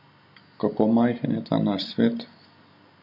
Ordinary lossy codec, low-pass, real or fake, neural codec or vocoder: MP3, 32 kbps; 5.4 kHz; real; none